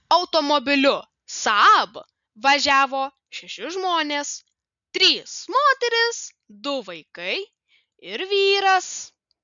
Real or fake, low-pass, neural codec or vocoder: real; 7.2 kHz; none